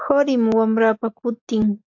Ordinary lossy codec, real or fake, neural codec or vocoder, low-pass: AAC, 48 kbps; real; none; 7.2 kHz